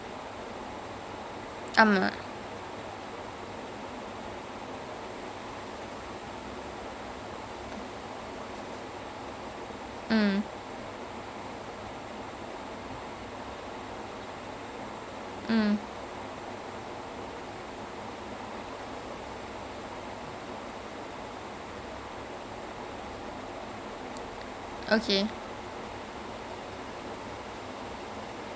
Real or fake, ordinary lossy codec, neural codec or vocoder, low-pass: real; none; none; none